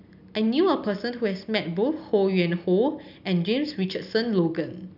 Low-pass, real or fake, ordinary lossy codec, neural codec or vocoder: 5.4 kHz; real; none; none